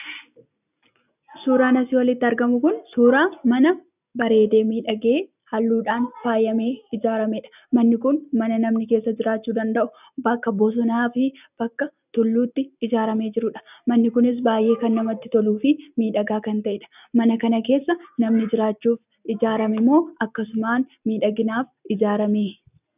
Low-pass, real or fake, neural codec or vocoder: 3.6 kHz; real; none